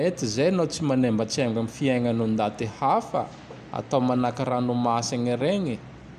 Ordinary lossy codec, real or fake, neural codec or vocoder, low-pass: none; real; none; 14.4 kHz